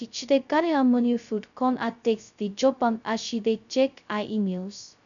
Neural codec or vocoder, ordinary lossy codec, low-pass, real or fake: codec, 16 kHz, 0.2 kbps, FocalCodec; none; 7.2 kHz; fake